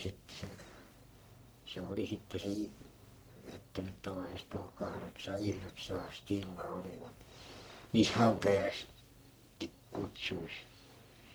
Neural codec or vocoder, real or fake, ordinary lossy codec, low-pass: codec, 44.1 kHz, 1.7 kbps, Pupu-Codec; fake; none; none